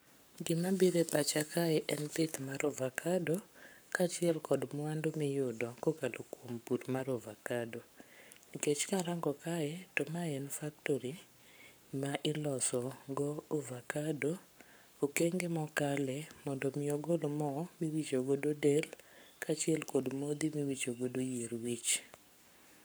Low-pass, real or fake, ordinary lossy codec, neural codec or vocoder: none; fake; none; codec, 44.1 kHz, 7.8 kbps, Pupu-Codec